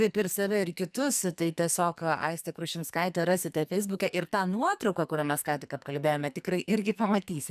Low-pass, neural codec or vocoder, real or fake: 14.4 kHz; codec, 44.1 kHz, 2.6 kbps, SNAC; fake